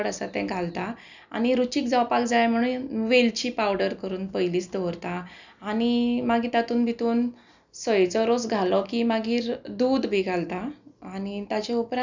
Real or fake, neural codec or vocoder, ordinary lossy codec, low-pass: real; none; none; 7.2 kHz